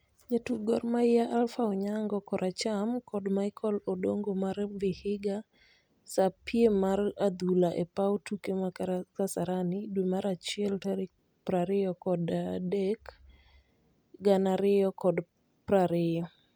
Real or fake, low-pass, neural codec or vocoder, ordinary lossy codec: real; none; none; none